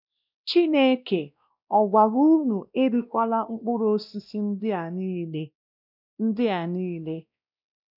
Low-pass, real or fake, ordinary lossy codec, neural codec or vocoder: 5.4 kHz; fake; none; codec, 16 kHz, 1 kbps, X-Codec, WavLM features, trained on Multilingual LibriSpeech